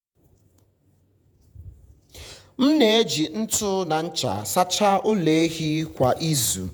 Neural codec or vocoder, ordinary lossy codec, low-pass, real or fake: vocoder, 48 kHz, 128 mel bands, Vocos; none; none; fake